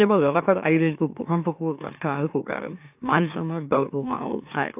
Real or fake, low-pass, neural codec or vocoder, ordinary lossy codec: fake; 3.6 kHz; autoencoder, 44.1 kHz, a latent of 192 numbers a frame, MeloTTS; AAC, 32 kbps